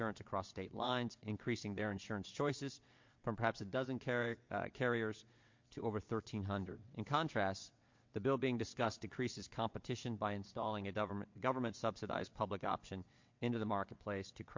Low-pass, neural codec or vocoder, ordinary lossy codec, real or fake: 7.2 kHz; vocoder, 22.05 kHz, 80 mel bands, WaveNeXt; MP3, 48 kbps; fake